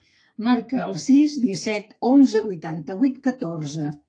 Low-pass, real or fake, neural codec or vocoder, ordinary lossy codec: 9.9 kHz; fake; codec, 32 kHz, 1.9 kbps, SNAC; AAC, 48 kbps